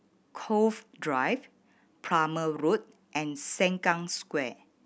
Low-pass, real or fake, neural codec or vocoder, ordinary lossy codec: none; real; none; none